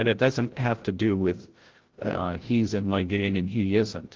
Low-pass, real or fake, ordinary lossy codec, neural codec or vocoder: 7.2 kHz; fake; Opus, 16 kbps; codec, 16 kHz, 0.5 kbps, FreqCodec, larger model